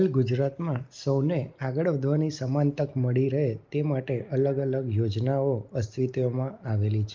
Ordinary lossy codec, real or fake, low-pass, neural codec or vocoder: Opus, 32 kbps; real; 7.2 kHz; none